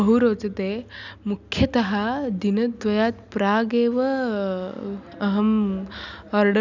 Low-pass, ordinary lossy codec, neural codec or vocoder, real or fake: 7.2 kHz; none; none; real